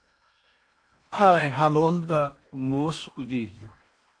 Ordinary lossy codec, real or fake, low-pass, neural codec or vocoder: AAC, 48 kbps; fake; 9.9 kHz; codec, 16 kHz in and 24 kHz out, 0.8 kbps, FocalCodec, streaming, 65536 codes